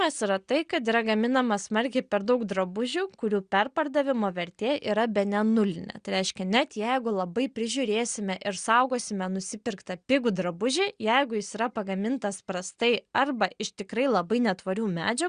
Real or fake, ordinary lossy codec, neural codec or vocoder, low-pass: real; Opus, 64 kbps; none; 9.9 kHz